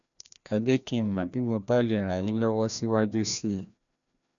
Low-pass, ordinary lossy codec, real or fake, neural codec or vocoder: 7.2 kHz; none; fake; codec, 16 kHz, 1 kbps, FreqCodec, larger model